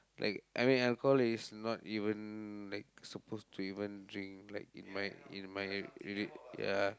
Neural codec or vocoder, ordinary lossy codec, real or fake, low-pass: none; none; real; none